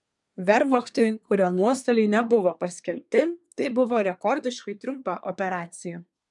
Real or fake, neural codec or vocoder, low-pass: fake; codec, 24 kHz, 1 kbps, SNAC; 10.8 kHz